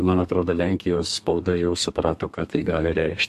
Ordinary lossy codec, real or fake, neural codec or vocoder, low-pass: MP3, 64 kbps; fake; codec, 32 kHz, 1.9 kbps, SNAC; 14.4 kHz